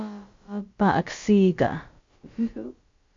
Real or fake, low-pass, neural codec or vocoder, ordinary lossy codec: fake; 7.2 kHz; codec, 16 kHz, about 1 kbps, DyCAST, with the encoder's durations; MP3, 48 kbps